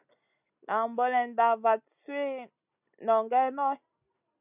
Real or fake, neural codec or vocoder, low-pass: real; none; 3.6 kHz